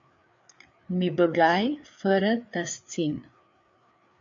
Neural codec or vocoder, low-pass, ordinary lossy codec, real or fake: codec, 16 kHz, 4 kbps, FreqCodec, larger model; 7.2 kHz; AAC, 64 kbps; fake